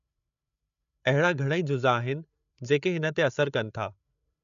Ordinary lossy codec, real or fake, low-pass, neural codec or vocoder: none; fake; 7.2 kHz; codec, 16 kHz, 8 kbps, FreqCodec, larger model